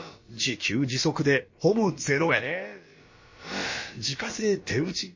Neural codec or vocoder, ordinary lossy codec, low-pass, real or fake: codec, 16 kHz, about 1 kbps, DyCAST, with the encoder's durations; MP3, 32 kbps; 7.2 kHz; fake